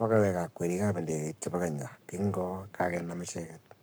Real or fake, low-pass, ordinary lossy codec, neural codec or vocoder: fake; none; none; codec, 44.1 kHz, 7.8 kbps, Pupu-Codec